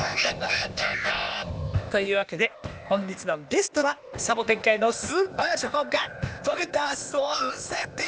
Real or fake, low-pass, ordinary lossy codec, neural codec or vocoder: fake; none; none; codec, 16 kHz, 0.8 kbps, ZipCodec